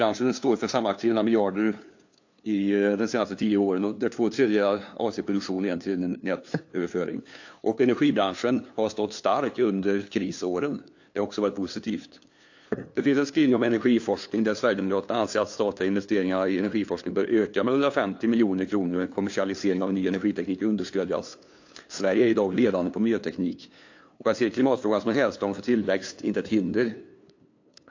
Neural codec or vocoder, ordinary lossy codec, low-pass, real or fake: codec, 16 kHz, 2 kbps, FunCodec, trained on LibriTTS, 25 frames a second; AAC, 48 kbps; 7.2 kHz; fake